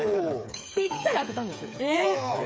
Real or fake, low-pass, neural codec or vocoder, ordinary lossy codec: fake; none; codec, 16 kHz, 8 kbps, FreqCodec, smaller model; none